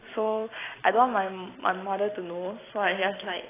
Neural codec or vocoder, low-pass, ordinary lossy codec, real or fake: vocoder, 44.1 kHz, 128 mel bands every 256 samples, BigVGAN v2; 3.6 kHz; AAC, 16 kbps; fake